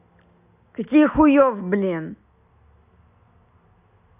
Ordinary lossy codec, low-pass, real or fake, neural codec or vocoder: AAC, 32 kbps; 3.6 kHz; real; none